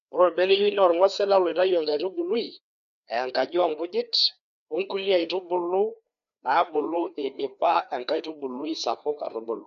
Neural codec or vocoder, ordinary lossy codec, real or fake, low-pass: codec, 16 kHz, 2 kbps, FreqCodec, larger model; none; fake; 7.2 kHz